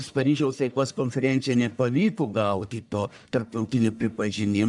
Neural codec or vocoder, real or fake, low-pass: codec, 44.1 kHz, 1.7 kbps, Pupu-Codec; fake; 10.8 kHz